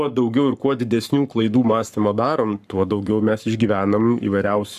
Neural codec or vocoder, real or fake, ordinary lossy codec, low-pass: codec, 44.1 kHz, 7.8 kbps, Pupu-Codec; fake; AAC, 96 kbps; 14.4 kHz